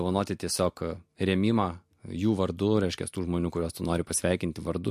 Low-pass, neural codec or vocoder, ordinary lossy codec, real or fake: 14.4 kHz; none; MP3, 64 kbps; real